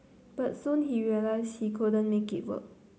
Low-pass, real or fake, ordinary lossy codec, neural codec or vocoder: none; real; none; none